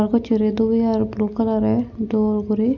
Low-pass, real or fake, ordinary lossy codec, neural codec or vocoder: 7.2 kHz; real; none; none